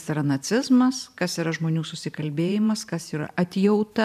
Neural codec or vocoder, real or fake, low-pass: vocoder, 44.1 kHz, 128 mel bands every 512 samples, BigVGAN v2; fake; 14.4 kHz